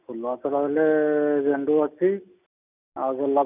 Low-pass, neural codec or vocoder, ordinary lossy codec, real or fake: 3.6 kHz; none; none; real